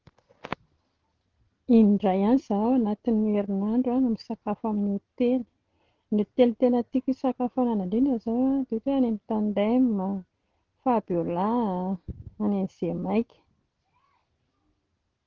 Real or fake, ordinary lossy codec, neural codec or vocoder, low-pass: real; Opus, 16 kbps; none; 7.2 kHz